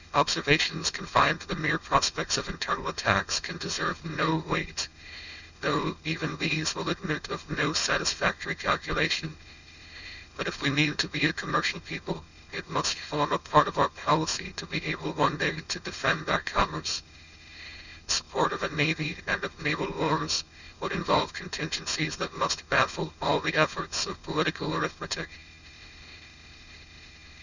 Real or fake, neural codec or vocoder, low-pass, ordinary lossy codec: fake; codec, 16 kHz, 4.8 kbps, FACodec; 7.2 kHz; Opus, 64 kbps